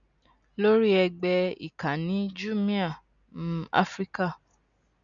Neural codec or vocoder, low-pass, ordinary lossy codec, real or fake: none; 7.2 kHz; none; real